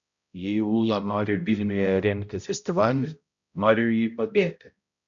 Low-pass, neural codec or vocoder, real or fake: 7.2 kHz; codec, 16 kHz, 0.5 kbps, X-Codec, HuBERT features, trained on balanced general audio; fake